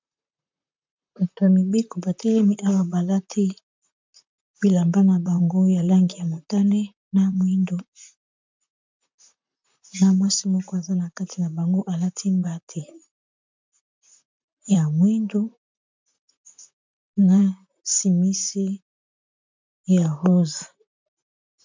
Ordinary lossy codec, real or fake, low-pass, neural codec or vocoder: MP3, 64 kbps; fake; 7.2 kHz; vocoder, 44.1 kHz, 128 mel bands, Pupu-Vocoder